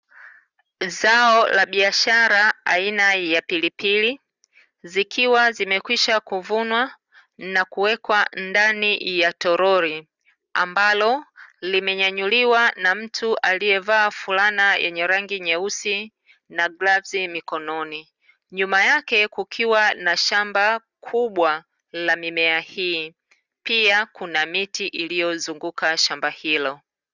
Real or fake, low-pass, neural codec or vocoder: real; 7.2 kHz; none